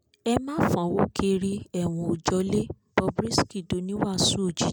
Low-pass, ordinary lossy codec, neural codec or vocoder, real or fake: none; none; none; real